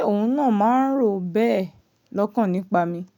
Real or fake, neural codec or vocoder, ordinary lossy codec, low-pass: real; none; none; 19.8 kHz